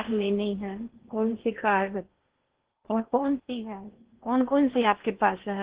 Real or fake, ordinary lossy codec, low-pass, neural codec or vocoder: fake; Opus, 16 kbps; 3.6 kHz; codec, 16 kHz in and 24 kHz out, 0.8 kbps, FocalCodec, streaming, 65536 codes